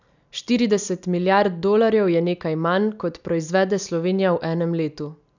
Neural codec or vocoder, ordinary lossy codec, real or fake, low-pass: none; none; real; 7.2 kHz